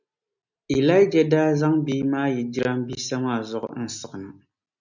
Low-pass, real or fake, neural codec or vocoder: 7.2 kHz; real; none